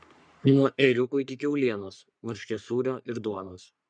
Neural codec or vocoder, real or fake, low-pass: codec, 44.1 kHz, 3.4 kbps, Pupu-Codec; fake; 9.9 kHz